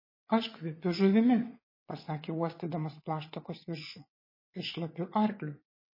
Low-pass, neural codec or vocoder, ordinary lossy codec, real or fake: 5.4 kHz; vocoder, 22.05 kHz, 80 mel bands, WaveNeXt; MP3, 24 kbps; fake